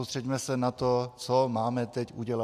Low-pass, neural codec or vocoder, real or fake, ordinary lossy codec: 14.4 kHz; none; real; AAC, 96 kbps